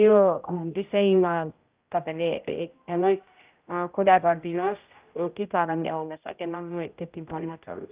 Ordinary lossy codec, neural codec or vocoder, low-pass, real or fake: Opus, 24 kbps; codec, 16 kHz, 0.5 kbps, X-Codec, HuBERT features, trained on general audio; 3.6 kHz; fake